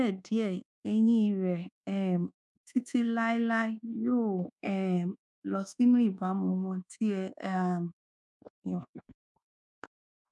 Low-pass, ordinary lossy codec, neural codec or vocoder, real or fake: none; none; codec, 24 kHz, 1.2 kbps, DualCodec; fake